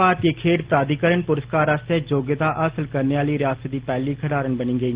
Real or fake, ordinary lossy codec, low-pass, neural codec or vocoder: real; Opus, 16 kbps; 3.6 kHz; none